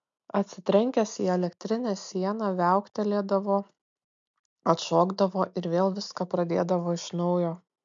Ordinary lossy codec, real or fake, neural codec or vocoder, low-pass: MP3, 64 kbps; real; none; 7.2 kHz